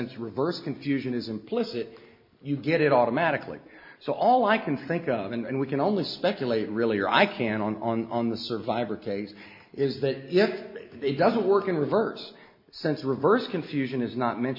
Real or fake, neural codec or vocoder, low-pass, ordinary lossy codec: real; none; 5.4 kHz; MP3, 32 kbps